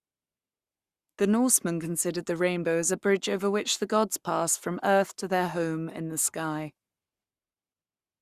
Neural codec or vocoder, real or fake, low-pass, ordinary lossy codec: codec, 44.1 kHz, 7.8 kbps, Pupu-Codec; fake; 14.4 kHz; Opus, 64 kbps